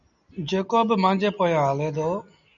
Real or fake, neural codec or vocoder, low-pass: real; none; 7.2 kHz